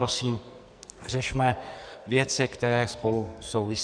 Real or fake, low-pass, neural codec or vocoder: fake; 9.9 kHz; codec, 44.1 kHz, 2.6 kbps, SNAC